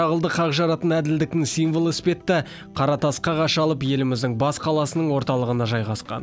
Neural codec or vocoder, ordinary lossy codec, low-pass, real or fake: none; none; none; real